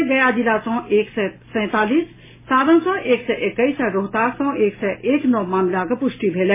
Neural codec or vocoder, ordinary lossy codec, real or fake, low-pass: none; MP3, 16 kbps; real; 3.6 kHz